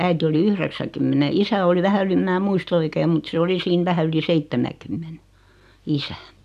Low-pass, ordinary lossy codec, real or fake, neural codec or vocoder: 14.4 kHz; none; real; none